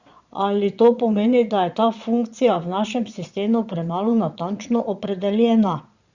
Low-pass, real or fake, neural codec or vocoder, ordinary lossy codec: 7.2 kHz; fake; vocoder, 22.05 kHz, 80 mel bands, Vocos; Opus, 64 kbps